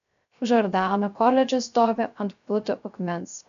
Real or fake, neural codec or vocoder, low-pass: fake; codec, 16 kHz, 0.3 kbps, FocalCodec; 7.2 kHz